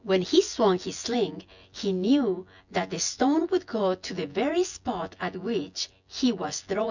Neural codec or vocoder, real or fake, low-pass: vocoder, 24 kHz, 100 mel bands, Vocos; fake; 7.2 kHz